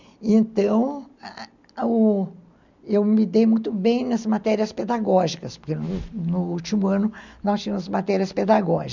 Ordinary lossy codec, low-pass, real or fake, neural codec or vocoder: none; 7.2 kHz; real; none